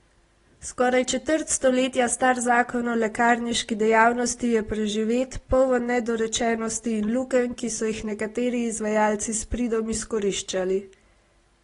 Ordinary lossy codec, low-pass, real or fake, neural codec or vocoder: AAC, 32 kbps; 10.8 kHz; real; none